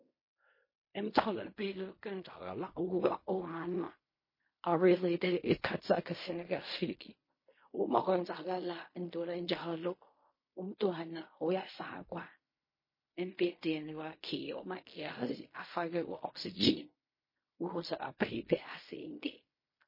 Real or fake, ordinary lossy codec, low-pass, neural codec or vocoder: fake; MP3, 24 kbps; 5.4 kHz; codec, 16 kHz in and 24 kHz out, 0.4 kbps, LongCat-Audio-Codec, fine tuned four codebook decoder